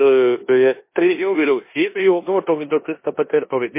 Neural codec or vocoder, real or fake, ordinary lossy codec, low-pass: codec, 16 kHz in and 24 kHz out, 0.9 kbps, LongCat-Audio-Codec, four codebook decoder; fake; MP3, 24 kbps; 3.6 kHz